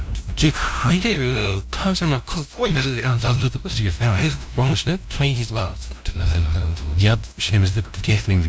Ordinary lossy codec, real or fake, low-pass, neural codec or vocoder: none; fake; none; codec, 16 kHz, 0.5 kbps, FunCodec, trained on LibriTTS, 25 frames a second